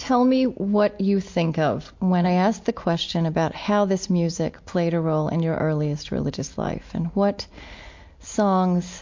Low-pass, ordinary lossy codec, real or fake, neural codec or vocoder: 7.2 kHz; MP3, 48 kbps; real; none